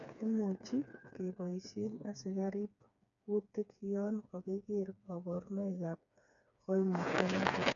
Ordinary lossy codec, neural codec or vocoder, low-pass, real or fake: none; codec, 16 kHz, 4 kbps, FreqCodec, smaller model; 7.2 kHz; fake